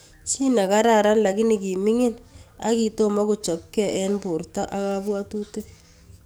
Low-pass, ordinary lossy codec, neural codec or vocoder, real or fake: none; none; codec, 44.1 kHz, 7.8 kbps, DAC; fake